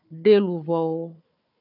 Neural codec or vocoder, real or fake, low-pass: codec, 16 kHz, 4 kbps, FunCodec, trained on Chinese and English, 50 frames a second; fake; 5.4 kHz